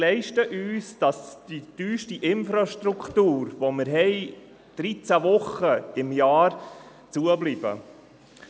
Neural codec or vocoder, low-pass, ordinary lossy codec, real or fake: none; none; none; real